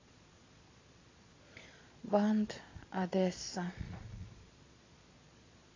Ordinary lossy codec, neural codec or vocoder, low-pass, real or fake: AAC, 32 kbps; vocoder, 22.05 kHz, 80 mel bands, Vocos; 7.2 kHz; fake